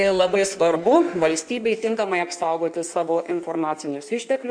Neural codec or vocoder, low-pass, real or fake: codec, 16 kHz in and 24 kHz out, 1.1 kbps, FireRedTTS-2 codec; 9.9 kHz; fake